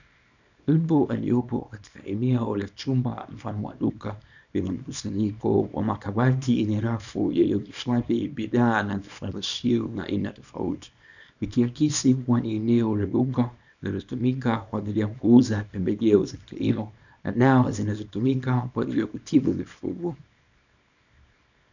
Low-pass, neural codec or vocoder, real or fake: 7.2 kHz; codec, 24 kHz, 0.9 kbps, WavTokenizer, small release; fake